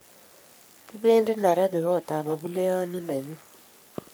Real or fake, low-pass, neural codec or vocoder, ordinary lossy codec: fake; none; codec, 44.1 kHz, 3.4 kbps, Pupu-Codec; none